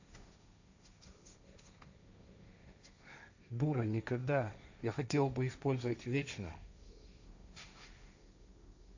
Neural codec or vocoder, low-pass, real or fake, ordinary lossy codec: codec, 16 kHz, 1.1 kbps, Voila-Tokenizer; 7.2 kHz; fake; AAC, 48 kbps